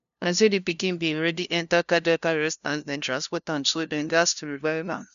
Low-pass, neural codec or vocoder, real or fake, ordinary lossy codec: 7.2 kHz; codec, 16 kHz, 0.5 kbps, FunCodec, trained on LibriTTS, 25 frames a second; fake; none